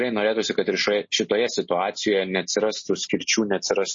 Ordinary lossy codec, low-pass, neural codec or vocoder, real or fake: MP3, 32 kbps; 7.2 kHz; none; real